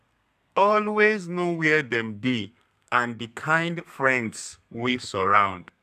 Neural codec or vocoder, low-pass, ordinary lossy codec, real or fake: codec, 32 kHz, 1.9 kbps, SNAC; 14.4 kHz; none; fake